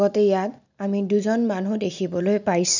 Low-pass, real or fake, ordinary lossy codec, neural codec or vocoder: 7.2 kHz; real; none; none